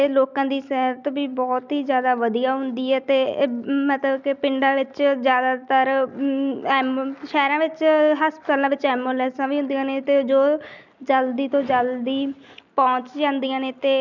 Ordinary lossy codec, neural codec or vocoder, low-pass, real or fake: none; none; 7.2 kHz; real